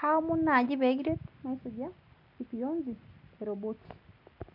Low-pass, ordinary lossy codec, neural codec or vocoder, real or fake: 5.4 kHz; none; none; real